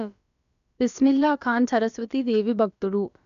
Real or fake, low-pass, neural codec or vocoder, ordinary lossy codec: fake; 7.2 kHz; codec, 16 kHz, about 1 kbps, DyCAST, with the encoder's durations; MP3, 96 kbps